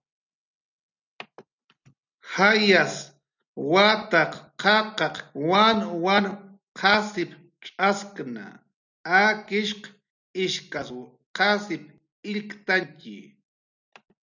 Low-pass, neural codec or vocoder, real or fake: 7.2 kHz; none; real